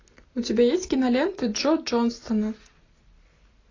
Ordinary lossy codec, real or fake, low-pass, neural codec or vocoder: AAC, 32 kbps; real; 7.2 kHz; none